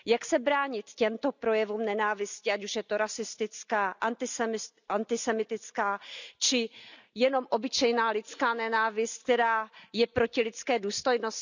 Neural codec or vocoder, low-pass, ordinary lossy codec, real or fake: none; 7.2 kHz; none; real